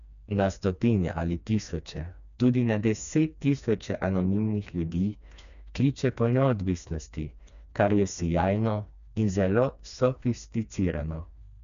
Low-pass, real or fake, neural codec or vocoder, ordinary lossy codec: 7.2 kHz; fake; codec, 16 kHz, 2 kbps, FreqCodec, smaller model; none